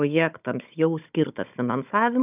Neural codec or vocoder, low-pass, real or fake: codec, 16 kHz, 16 kbps, FunCodec, trained on Chinese and English, 50 frames a second; 3.6 kHz; fake